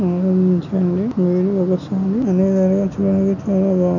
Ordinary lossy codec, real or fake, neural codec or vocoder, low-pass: none; real; none; 7.2 kHz